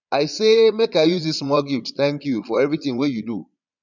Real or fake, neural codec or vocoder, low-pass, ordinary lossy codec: fake; vocoder, 22.05 kHz, 80 mel bands, Vocos; 7.2 kHz; none